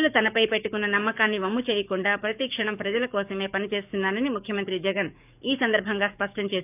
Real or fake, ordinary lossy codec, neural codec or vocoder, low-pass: fake; none; codec, 44.1 kHz, 7.8 kbps, DAC; 3.6 kHz